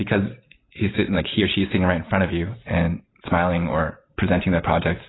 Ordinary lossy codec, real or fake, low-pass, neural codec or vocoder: AAC, 16 kbps; real; 7.2 kHz; none